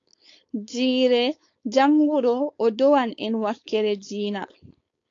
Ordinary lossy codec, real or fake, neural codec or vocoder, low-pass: AAC, 48 kbps; fake; codec, 16 kHz, 4.8 kbps, FACodec; 7.2 kHz